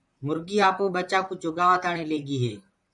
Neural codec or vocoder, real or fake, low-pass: vocoder, 44.1 kHz, 128 mel bands, Pupu-Vocoder; fake; 10.8 kHz